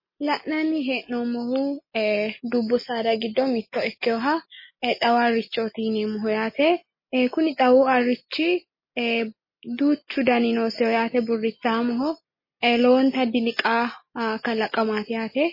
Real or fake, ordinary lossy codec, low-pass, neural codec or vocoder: real; MP3, 24 kbps; 5.4 kHz; none